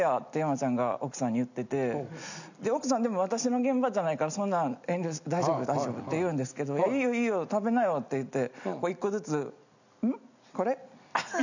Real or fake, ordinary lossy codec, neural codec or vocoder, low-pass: real; none; none; 7.2 kHz